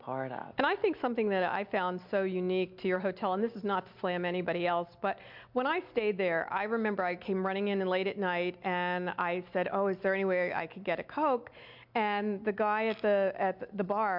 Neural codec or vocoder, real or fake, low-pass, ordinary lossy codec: none; real; 5.4 kHz; MP3, 48 kbps